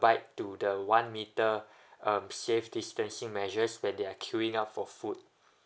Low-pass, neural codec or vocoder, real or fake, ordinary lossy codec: none; none; real; none